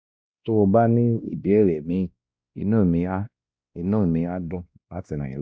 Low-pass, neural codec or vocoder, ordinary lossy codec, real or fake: none; codec, 16 kHz, 1 kbps, X-Codec, WavLM features, trained on Multilingual LibriSpeech; none; fake